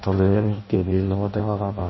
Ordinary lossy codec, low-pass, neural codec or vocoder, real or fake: MP3, 24 kbps; 7.2 kHz; codec, 16 kHz in and 24 kHz out, 0.6 kbps, FireRedTTS-2 codec; fake